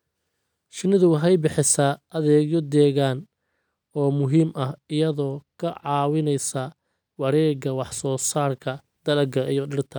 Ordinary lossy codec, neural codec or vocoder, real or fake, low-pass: none; none; real; none